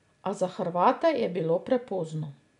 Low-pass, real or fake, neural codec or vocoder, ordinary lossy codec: 10.8 kHz; real; none; none